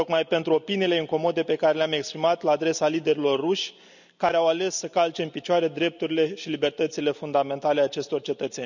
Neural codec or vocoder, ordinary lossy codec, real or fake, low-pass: none; none; real; 7.2 kHz